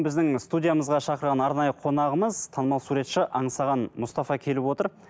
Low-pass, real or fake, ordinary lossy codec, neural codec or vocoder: none; real; none; none